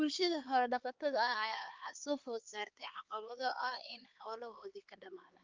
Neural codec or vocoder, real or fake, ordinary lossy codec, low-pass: codec, 16 kHz, 4 kbps, X-Codec, HuBERT features, trained on LibriSpeech; fake; Opus, 24 kbps; 7.2 kHz